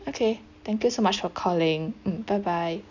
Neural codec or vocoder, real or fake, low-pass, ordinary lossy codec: none; real; 7.2 kHz; none